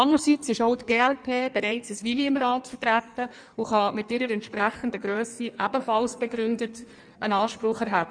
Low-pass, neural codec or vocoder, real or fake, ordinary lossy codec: 9.9 kHz; codec, 16 kHz in and 24 kHz out, 1.1 kbps, FireRedTTS-2 codec; fake; none